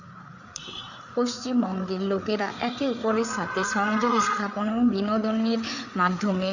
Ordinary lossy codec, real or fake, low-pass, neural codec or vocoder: none; fake; 7.2 kHz; codec, 16 kHz, 4 kbps, FreqCodec, larger model